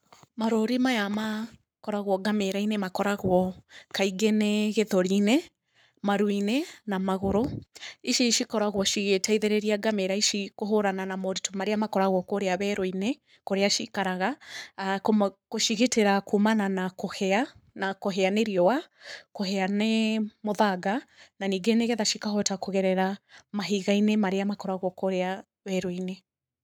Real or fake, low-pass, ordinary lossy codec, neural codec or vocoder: fake; none; none; codec, 44.1 kHz, 7.8 kbps, Pupu-Codec